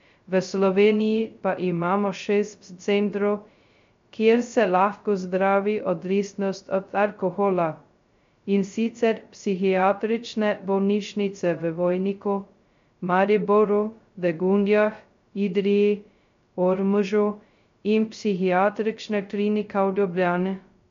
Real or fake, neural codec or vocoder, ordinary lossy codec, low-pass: fake; codec, 16 kHz, 0.2 kbps, FocalCodec; MP3, 48 kbps; 7.2 kHz